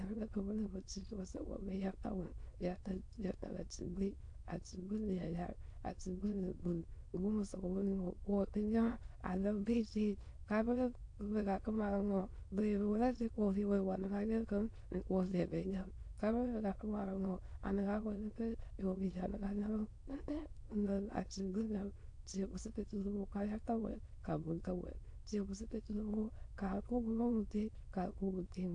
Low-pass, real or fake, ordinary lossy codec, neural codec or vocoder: 9.9 kHz; fake; AAC, 48 kbps; autoencoder, 22.05 kHz, a latent of 192 numbers a frame, VITS, trained on many speakers